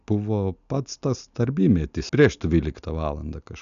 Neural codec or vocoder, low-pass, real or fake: none; 7.2 kHz; real